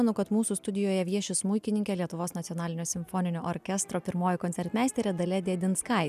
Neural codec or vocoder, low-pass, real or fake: none; 14.4 kHz; real